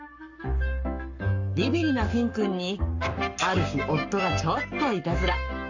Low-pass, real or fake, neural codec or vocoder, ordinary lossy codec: 7.2 kHz; fake; codec, 44.1 kHz, 7.8 kbps, Pupu-Codec; none